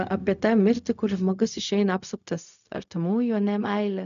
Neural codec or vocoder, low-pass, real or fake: codec, 16 kHz, 0.4 kbps, LongCat-Audio-Codec; 7.2 kHz; fake